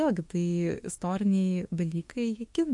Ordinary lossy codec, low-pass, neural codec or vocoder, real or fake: MP3, 48 kbps; 10.8 kHz; autoencoder, 48 kHz, 32 numbers a frame, DAC-VAE, trained on Japanese speech; fake